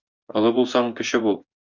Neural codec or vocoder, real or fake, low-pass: vocoder, 24 kHz, 100 mel bands, Vocos; fake; 7.2 kHz